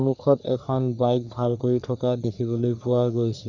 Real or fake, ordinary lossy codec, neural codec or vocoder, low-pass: fake; none; codec, 44.1 kHz, 3.4 kbps, Pupu-Codec; 7.2 kHz